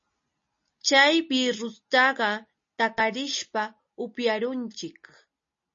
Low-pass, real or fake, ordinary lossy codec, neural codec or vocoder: 7.2 kHz; real; MP3, 32 kbps; none